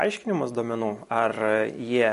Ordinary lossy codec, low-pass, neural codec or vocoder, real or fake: MP3, 48 kbps; 14.4 kHz; none; real